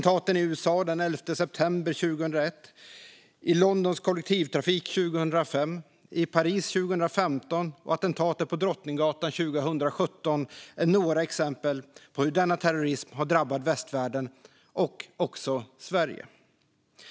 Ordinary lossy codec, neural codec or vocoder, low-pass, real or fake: none; none; none; real